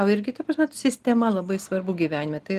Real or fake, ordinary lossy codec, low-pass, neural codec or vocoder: real; Opus, 24 kbps; 14.4 kHz; none